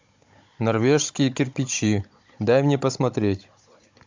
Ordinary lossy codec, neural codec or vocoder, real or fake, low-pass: MP3, 64 kbps; codec, 16 kHz, 16 kbps, FunCodec, trained on Chinese and English, 50 frames a second; fake; 7.2 kHz